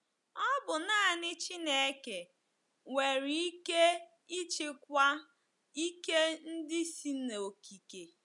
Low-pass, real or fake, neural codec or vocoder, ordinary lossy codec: 10.8 kHz; real; none; none